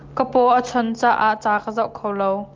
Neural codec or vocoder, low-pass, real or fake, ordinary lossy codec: none; 7.2 kHz; real; Opus, 24 kbps